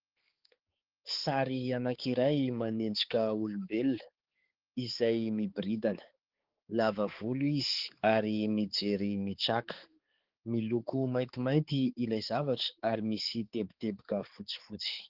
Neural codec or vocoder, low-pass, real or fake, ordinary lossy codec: codec, 16 kHz, 4 kbps, X-Codec, HuBERT features, trained on balanced general audio; 5.4 kHz; fake; Opus, 16 kbps